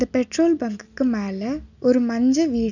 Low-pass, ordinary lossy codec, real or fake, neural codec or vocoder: 7.2 kHz; none; real; none